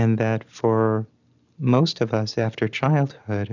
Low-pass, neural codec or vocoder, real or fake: 7.2 kHz; none; real